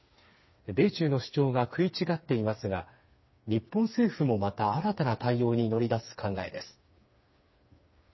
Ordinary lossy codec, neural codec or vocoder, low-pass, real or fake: MP3, 24 kbps; codec, 16 kHz, 4 kbps, FreqCodec, smaller model; 7.2 kHz; fake